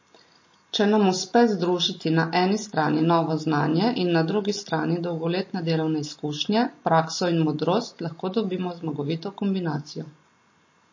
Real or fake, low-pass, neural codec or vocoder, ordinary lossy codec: real; 7.2 kHz; none; MP3, 32 kbps